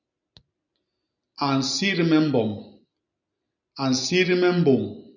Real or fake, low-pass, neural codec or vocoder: real; 7.2 kHz; none